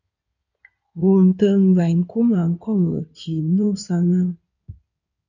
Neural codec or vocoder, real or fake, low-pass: codec, 16 kHz in and 24 kHz out, 2.2 kbps, FireRedTTS-2 codec; fake; 7.2 kHz